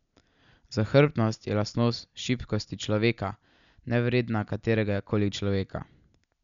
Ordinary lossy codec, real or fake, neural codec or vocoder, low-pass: none; real; none; 7.2 kHz